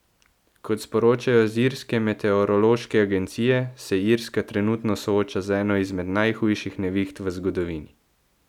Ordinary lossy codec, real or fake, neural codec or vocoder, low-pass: none; real; none; 19.8 kHz